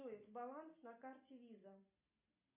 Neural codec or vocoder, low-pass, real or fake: none; 3.6 kHz; real